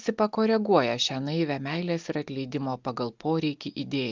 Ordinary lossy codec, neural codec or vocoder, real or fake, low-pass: Opus, 24 kbps; none; real; 7.2 kHz